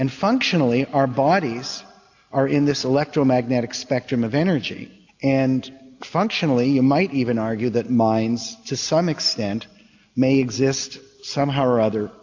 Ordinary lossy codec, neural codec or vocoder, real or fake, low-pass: AAC, 48 kbps; none; real; 7.2 kHz